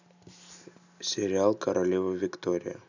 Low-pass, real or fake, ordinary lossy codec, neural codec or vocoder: 7.2 kHz; real; none; none